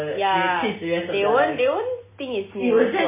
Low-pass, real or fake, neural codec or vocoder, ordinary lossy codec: 3.6 kHz; real; none; MP3, 16 kbps